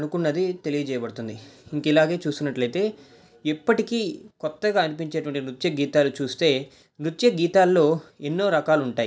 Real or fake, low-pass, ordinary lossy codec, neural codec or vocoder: real; none; none; none